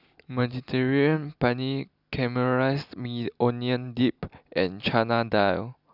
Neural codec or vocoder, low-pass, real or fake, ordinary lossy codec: none; 5.4 kHz; real; none